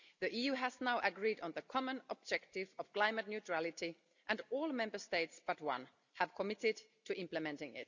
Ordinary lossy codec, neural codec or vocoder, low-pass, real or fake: none; none; 7.2 kHz; real